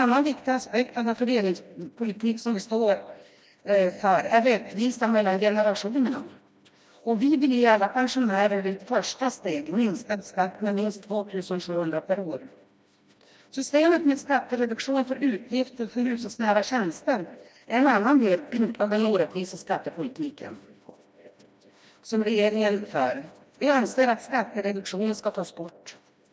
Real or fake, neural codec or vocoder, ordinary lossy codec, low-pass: fake; codec, 16 kHz, 1 kbps, FreqCodec, smaller model; none; none